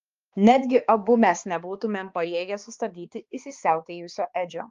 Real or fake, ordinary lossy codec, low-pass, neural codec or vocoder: fake; Opus, 32 kbps; 7.2 kHz; codec, 16 kHz, 2 kbps, X-Codec, HuBERT features, trained on balanced general audio